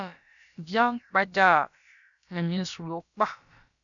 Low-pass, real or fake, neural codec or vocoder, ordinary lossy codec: 7.2 kHz; fake; codec, 16 kHz, about 1 kbps, DyCAST, with the encoder's durations; MP3, 96 kbps